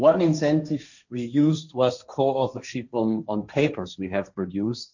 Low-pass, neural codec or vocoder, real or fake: 7.2 kHz; codec, 16 kHz, 1.1 kbps, Voila-Tokenizer; fake